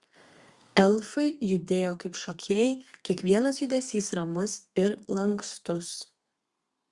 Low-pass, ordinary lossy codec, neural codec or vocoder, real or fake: 10.8 kHz; Opus, 64 kbps; codec, 32 kHz, 1.9 kbps, SNAC; fake